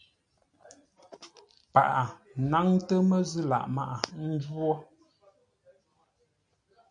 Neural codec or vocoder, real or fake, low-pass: none; real; 9.9 kHz